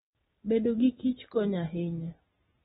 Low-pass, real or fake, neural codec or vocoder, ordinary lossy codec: 19.8 kHz; real; none; AAC, 16 kbps